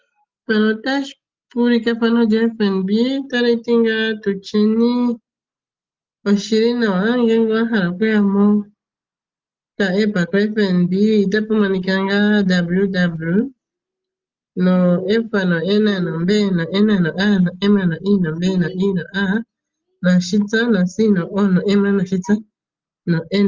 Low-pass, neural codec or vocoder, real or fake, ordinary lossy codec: 7.2 kHz; none; real; Opus, 32 kbps